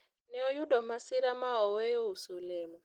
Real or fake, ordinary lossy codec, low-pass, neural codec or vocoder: real; Opus, 16 kbps; 19.8 kHz; none